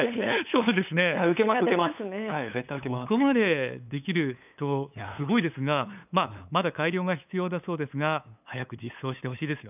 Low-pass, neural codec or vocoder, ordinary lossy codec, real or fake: 3.6 kHz; codec, 16 kHz, 8 kbps, FunCodec, trained on LibriTTS, 25 frames a second; none; fake